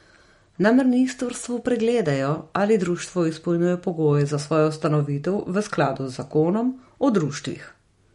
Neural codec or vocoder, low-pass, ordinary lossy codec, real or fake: none; 19.8 kHz; MP3, 48 kbps; real